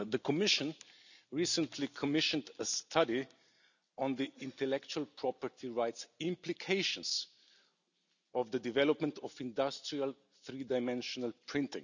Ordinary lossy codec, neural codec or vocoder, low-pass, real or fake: none; none; 7.2 kHz; real